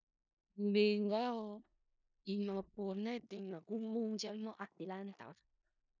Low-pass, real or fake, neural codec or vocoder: 7.2 kHz; fake; codec, 16 kHz in and 24 kHz out, 0.4 kbps, LongCat-Audio-Codec, four codebook decoder